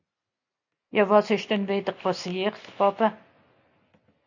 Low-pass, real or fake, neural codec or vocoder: 7.2 kHz; real; none